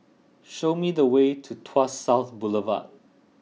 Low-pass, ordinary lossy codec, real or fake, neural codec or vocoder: none; none; real; none